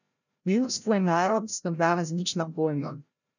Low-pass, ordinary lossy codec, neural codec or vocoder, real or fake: 7.2 kHz; none; codec, 16 kHz, 0.5 kbps, FreqCodec, larger model; fake